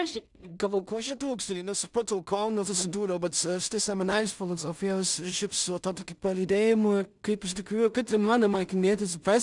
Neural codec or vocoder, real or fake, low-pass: codec, 16 kHz in and 24 kHz out, 0.4 kbps, LongCat-Audio-Codec, two codebook decoder; fake; 10.8 kHz